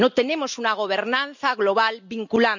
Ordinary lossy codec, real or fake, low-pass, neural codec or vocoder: none; real; 7.2 kHz; none